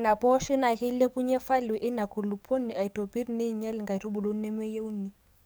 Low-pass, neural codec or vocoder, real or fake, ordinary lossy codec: none; codec, 44.1 kHz, 7.8 kbps, DAC; fake; none